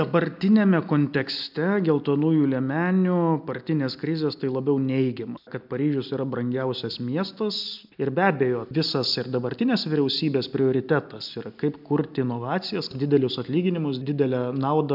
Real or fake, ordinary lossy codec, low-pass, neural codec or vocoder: real; MP3, 48 kbps; 5.4 kHz; none